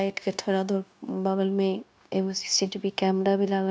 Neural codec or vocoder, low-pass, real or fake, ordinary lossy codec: codec, 16 kHz, 0.9 kbps, LongCat-Audio-Codec; none; fake; none